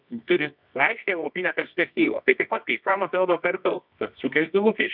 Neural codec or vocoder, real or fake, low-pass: codec, 24 kHz, 0.9 kbps, WavTokenizer, medium music audio release; fake; 5.4 kHz